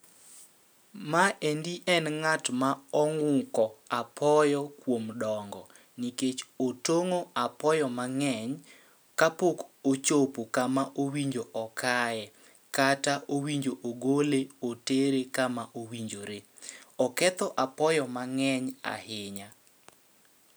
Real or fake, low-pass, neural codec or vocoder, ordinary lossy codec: real; none; none; none